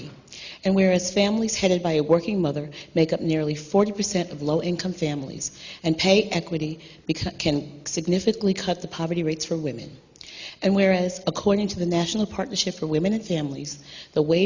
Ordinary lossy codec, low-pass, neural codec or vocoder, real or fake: Opus, 64 kbps; 7.2 kHz; none; real